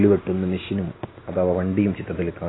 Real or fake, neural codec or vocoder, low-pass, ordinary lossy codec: real; none; 7.2 kHz; AAC, 16 kbps